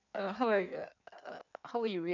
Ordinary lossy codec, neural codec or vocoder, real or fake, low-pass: none; codec, 16 kHz in and 24 kHz out, 1.1 kbps, FireRedTTS-2 codec; fake; 7.2 kHz